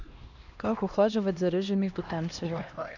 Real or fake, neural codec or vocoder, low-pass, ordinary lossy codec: fake; codec, 16 kHz, 1 kbps, X-Codec, HuBERT features, trained on LibriSpeech; 7.2 kHz; none